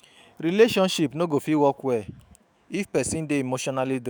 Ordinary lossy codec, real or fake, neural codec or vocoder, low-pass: none; fake; autoencoder, 48 kHz, 128 numbers a frame, DAC-VAE, trained on Japanese speech; none